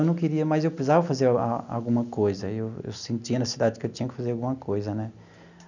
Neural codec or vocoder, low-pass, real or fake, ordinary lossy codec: none; 7.2 kHz; real; none